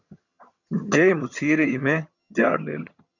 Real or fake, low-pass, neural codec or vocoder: fake; 7.2 kHz; vocoder, 22.05 kHz, 80 mel bands, HiFi-GAN